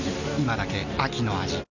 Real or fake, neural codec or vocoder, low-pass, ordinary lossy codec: real; none; 7.2 kHz; none